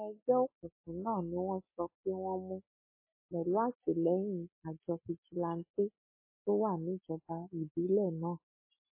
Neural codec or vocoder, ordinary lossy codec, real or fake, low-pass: none; MP3, 16 kbps; real; 3.6 kHz